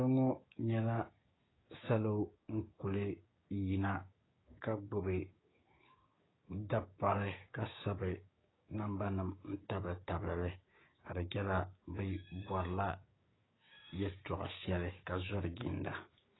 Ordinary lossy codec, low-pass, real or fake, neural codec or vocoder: AAC, 16 kbps; 7.2 kHz; fake; codec, 16 kHz, 6 kbps, DAC